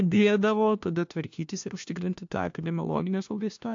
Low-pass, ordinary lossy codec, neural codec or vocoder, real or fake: 7.2 kHz; AAC, 64 kbps; codec, 16 kHz, 1 kbps, FunCodec, trained on LibriTTS, 50 frames a second; fake